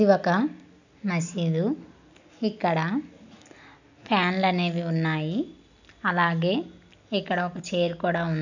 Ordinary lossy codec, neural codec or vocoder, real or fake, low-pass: none; none; real; 7.2 kHz